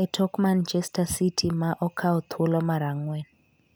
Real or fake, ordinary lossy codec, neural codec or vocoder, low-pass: real; none; none; none